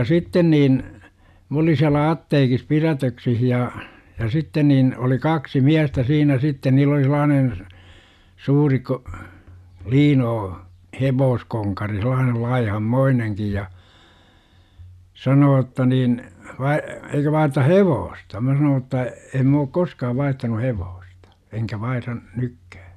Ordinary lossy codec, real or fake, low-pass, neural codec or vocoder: none; real; 14.4 kHz; none